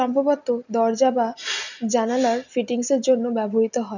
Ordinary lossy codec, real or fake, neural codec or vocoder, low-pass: none; real; none; 7.2 kHz